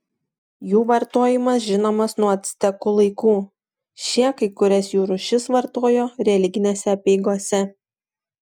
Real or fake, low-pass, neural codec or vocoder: real; 19.8 kHz; none